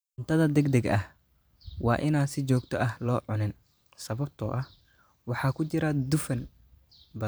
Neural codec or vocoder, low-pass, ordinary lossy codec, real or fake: vocoder, 44.1 kHz, 128 mel bands every 512 samples, BigVGAN v2; none; none; fake